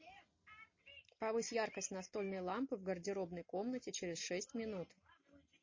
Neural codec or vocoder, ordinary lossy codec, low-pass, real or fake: none; MP3, 32 kbps; 7.2 kHz; real